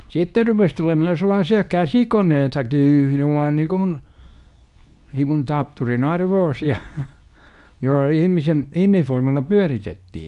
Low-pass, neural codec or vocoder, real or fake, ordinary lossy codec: 10.8 kHz; codec, 24 kHz, 0.9 kbps, WavTokenizer, medium speech release version 2; fake; AAC, 96 kbps